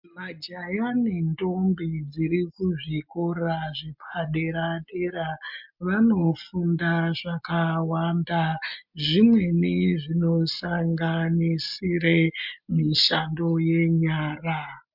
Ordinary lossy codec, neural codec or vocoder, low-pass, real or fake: MP3, 48 kbps; none; 5.4 kHz; real